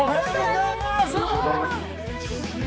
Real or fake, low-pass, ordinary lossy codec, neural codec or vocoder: fake; none; none; codec, 16 kHz, 4 kbps, X-Codec, HuBERT features, trained on balanced general audio